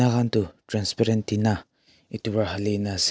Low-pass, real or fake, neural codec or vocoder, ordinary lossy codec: none; real; none; none